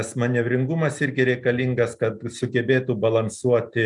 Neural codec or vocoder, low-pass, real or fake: none; 10.8 kHz; real